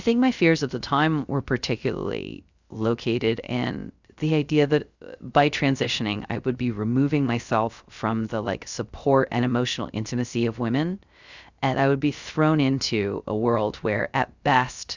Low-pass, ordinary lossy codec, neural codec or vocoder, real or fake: 7.2 kHz; Opus, 64 kbps; codec, 16 kHz, 0.3 kbps, FocalCodec; fake